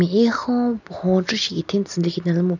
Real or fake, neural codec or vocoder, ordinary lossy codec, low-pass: real; none; none; 7.2 kHz